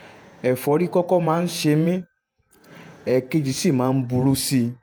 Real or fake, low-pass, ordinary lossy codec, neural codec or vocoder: fake; none; none; vocoder, 48 kHz, 128 mel bands, Vocos